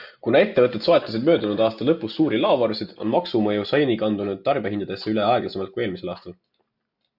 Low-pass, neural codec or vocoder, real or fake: 5.4 kHz; none; real